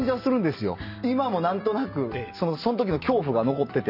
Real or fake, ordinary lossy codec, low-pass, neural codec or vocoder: real; none; 5.4 kHz; none